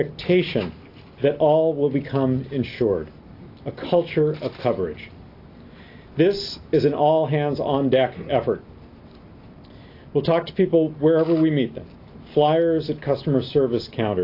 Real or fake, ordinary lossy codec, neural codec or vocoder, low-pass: real; AAC, 32 kbps; none; 5.4 kHz